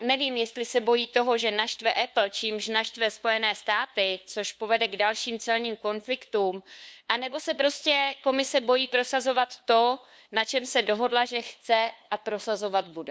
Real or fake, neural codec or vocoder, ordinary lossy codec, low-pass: fake; codec, 16 kHz, 2 kbps, FunCodec, trained on LibriTTS, 25 frames a second; none; none